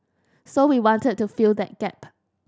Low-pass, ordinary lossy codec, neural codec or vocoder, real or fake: none; none; none; real